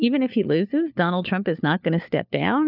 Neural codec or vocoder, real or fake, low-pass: codec, 16 kHz, 4 kbps, FunCodec, trained on LibriTTS, 50 frames a second; fake; 5.4 kHz